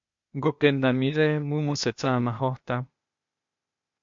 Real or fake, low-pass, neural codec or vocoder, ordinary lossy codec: fake; 7.2 kHz; codec, 16 kHz, 0.8 kbps, ZipCodec; MP3, 48 kbps